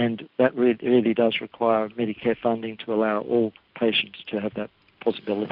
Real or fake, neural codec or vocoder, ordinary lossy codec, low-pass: real; none; Opus, 64 kbps; 5.4 kHz